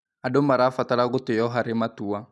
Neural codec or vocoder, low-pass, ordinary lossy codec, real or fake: none; none; none; real